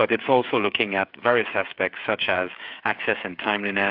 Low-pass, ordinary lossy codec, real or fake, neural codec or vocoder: 5.4 kHz; AAC, 48 kbps; fake; codec, 16 kHz, 8 kbps, FreqCodec, smaller model